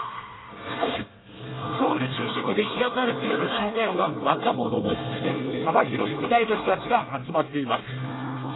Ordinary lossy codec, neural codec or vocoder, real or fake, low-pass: AAC, 16 kbps; codec, 24 kHz, 1 kbps, SNAC; fake; 7.2 kHz